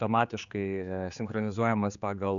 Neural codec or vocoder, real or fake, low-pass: codec, 16 kHz, 4 kbps, X-Codec, HuBERT features, trained on general audio; fake; 7.2 kHz